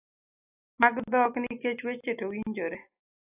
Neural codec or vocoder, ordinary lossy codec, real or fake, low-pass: none; AAC, 32 kbps; real; 3.6 kHz